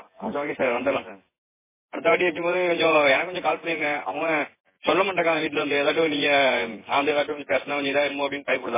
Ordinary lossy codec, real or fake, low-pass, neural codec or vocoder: MP3, 16 kbps; fake; 3.6 kHz; vocoder, 24 kHz, 100 mel bands, Vocos